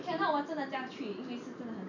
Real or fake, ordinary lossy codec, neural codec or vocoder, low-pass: real; none; none; 7.2 kHz